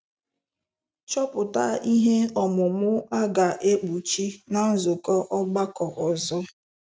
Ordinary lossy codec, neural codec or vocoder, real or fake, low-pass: none; none; real; none